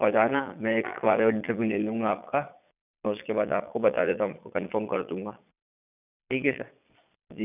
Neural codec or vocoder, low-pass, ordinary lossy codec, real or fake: vocoder, 22.05 kHz, 80 mel bands, Vocos; 3.6 kHz; none; fake